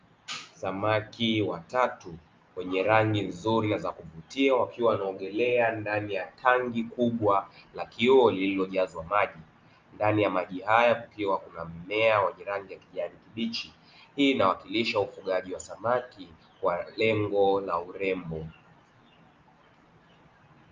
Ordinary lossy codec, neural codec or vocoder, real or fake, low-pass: Opus, 24 kbps; none; real; 7.2 kHz